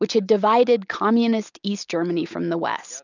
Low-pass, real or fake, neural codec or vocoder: 7.2 kHz; real; none